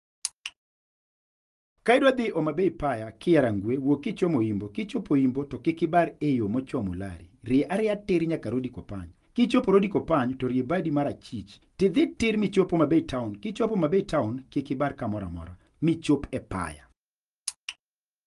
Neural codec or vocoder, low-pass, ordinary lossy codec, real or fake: none; 10.8 kHz; Opus, 32 kbps; real